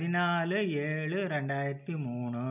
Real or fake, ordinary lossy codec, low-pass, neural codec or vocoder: real; none; 3.6 kHz; none